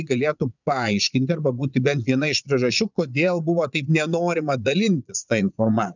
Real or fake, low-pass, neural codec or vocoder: fake; 7.2 kHz; vocoder, 22.05 kHz, 80 mel bands, Vocos